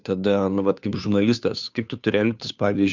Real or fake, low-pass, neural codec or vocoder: fake; 7.2 kHz; codec, 16 kHz, 2 kbps, FunCodec, trained on Chinese and English, 25 frames a second